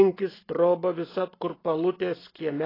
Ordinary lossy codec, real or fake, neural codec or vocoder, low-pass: AAC, 24 kbps; real; none; 5.4 kHz